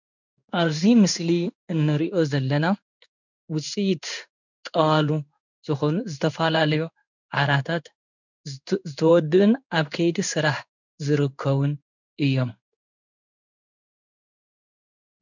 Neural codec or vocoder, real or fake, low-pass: codec, 16 kHz in and 24 kHz out, 1 kbps, XY-Tokenizer; fake; 7.2 kHz